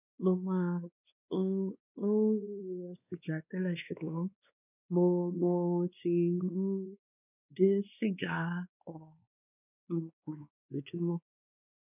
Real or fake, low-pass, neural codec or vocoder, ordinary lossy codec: fake; 3.6 kHz; codec, 16 kHz, 2 kbps, X-Codec, WavLM features, trained on Multilingual LibriSpeech; none